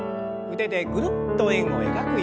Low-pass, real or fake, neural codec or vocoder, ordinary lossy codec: none; real; none; none